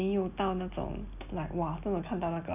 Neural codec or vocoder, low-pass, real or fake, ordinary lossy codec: none; 3.6 kHz; real; none